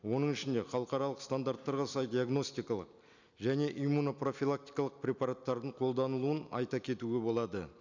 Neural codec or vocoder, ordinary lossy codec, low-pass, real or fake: none; none; 7.2 kHz; real